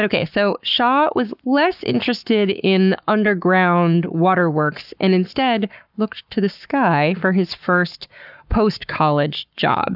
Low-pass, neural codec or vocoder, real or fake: 5.4 kHz; codec, 44.1 kHz, 7.8 kbps, Pupu-Codec; fake